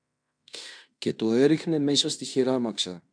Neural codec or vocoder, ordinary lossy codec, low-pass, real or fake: codec, 16 kHz in and 24 kHz out, 0.9 kbps, LongCat-Audio-Codec, fine tuned four codebook decoder; MP3, 96 kbps; 9.9 kHz; fake